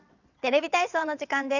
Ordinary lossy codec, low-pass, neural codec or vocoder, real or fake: none; 7.2 kHz; vocoder, 22.05 kHz, 80 mel bands, WaveNeXt; fake